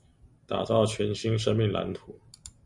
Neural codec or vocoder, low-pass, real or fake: none; 10.8 kHz; real